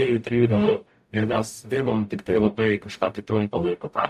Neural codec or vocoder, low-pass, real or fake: codec, 44.1 kHz, 0.9 kbps, DAC; 14.4 kHz; fake